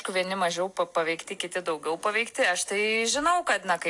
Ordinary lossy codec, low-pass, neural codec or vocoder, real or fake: AAC, 64 kbps; 10.8 kHz; none; real